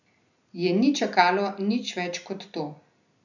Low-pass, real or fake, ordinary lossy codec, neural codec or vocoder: 7.2 kHz; real; none; none